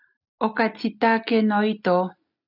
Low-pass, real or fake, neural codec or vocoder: 5.4 kHz; real; none